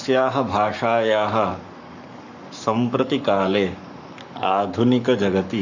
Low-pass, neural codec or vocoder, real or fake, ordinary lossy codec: 7.2 kHz; codec, 44.1 kHz, 7.8 kbps, Pupu-Codec; fake; none